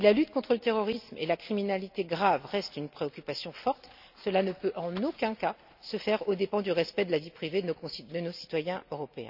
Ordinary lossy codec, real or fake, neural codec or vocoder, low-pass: none; real; none; 5.4 kHz